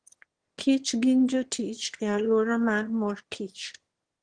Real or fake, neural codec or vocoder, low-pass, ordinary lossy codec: fake; autoencoder, 22.05 kHz, a latent of 192 numbers a frame, VITS, trained on one speaker; 9.9 kHz; Opus, 16 kbps